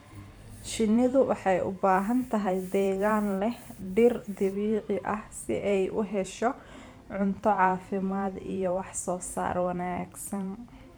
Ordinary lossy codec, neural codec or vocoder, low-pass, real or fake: none; vocoder, 44.1 kHz, 128 mel bands every 512 samples, BigVGAN v2; none; fake